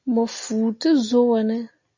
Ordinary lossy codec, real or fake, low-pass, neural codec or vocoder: MP3, 48 kbps; real; 7.2 kHz; none